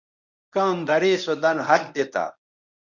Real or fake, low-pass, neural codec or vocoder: fake; 7.2 kHz; codec, 24 kHz, 0.9 kbps, WavTokenizer, medium speech release version 1